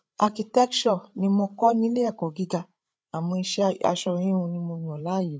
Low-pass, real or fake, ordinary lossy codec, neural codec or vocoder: none; fake; none; codec, 16 kHz, 8 kbps, FreqCodec, larger model